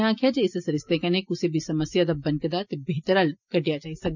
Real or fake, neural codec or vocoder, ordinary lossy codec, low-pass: real; none; none; 7.2 kHz